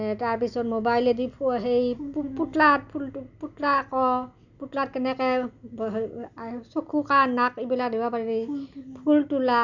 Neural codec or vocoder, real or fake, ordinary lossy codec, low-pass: none; real; none; 7.2 kHz